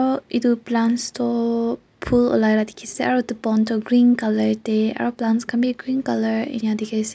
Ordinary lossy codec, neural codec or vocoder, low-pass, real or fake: none; none; none; real